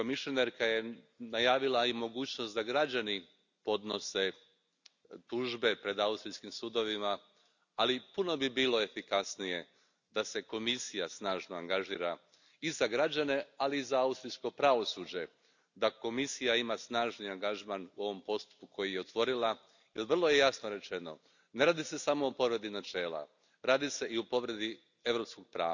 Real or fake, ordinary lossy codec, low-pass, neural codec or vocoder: real; none; 7.2 kHz; none